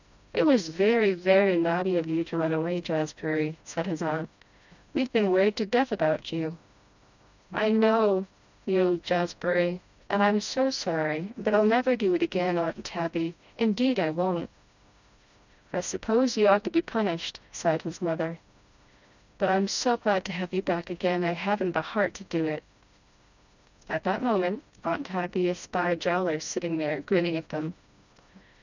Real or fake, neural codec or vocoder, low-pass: fake; codec, 16 kHz, 1 kbps, FreqCodec, smaller model; 7.2 kHz